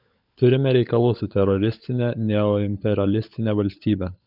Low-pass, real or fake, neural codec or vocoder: 5.4 kHz; fake; codec, 16 kHz, 16 kbps, FunCodec, trained on LibriTTS, 50 frames a second